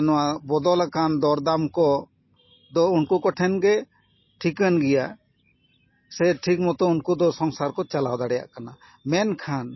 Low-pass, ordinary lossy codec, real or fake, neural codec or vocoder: 7.2 kHz; MP3, 24 kbps; real; none